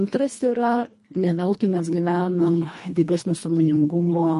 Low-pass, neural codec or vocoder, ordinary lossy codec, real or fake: 10.8 kHz; codec, 24 kHz, 1.5 kbps, HILCodec; MP3, 48 kbps; fake